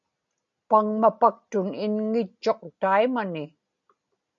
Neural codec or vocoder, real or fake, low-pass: none; real; 7.2 kHz